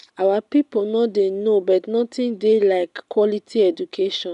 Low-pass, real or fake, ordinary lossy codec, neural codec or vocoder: 10.8 kHz; real; none; none